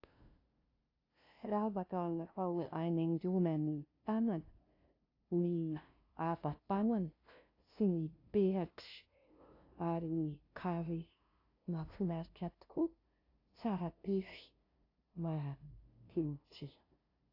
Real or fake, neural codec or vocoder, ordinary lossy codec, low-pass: fake; codec, 16 kHz, 0.5 kbps, FunCodec, trained on LibriTTS, 25 frames a second; AAC, 32 kbps; 5.4 kHz